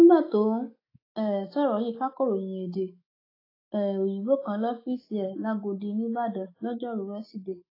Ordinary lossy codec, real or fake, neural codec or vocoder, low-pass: AAC, 24 kbps; fake; autoencoder, 48 kHz, 128 numbers a frame, DAC-VAE, trained on Japanese speech; 5.4 kHz